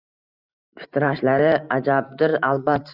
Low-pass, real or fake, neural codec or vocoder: 5.4 kHz; fake; vocoder, 44.1 kHz, 128 mel bands every 512 samples, BigVGAN v2